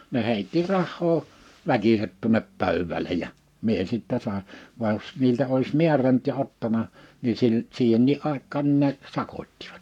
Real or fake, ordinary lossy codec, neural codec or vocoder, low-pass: fake; none; codec, 44.1 kHz, 7.8 kbps, Pupu-Codec; 19.8 kHz